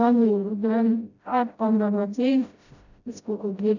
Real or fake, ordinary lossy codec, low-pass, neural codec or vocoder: fake; none; 7.2 kHz; codec, 16 kHz, 0.5 kbps, FreqCodec, smaller model